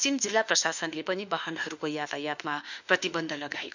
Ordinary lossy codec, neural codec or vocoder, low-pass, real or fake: none; autoencoder, 48 kHz, 32 numbers a frame, DAC-VAE, trained on Japanese speech; 7.2 kHz; fake